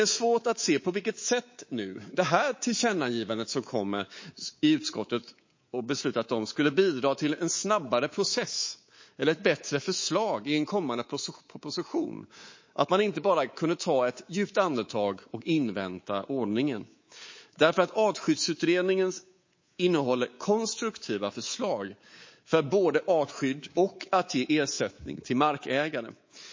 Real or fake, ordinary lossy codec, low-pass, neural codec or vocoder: fake; MP3, 32 kbps; 7.2 kHz; codec, 24 kHz, 3.1 kbps, DualCodec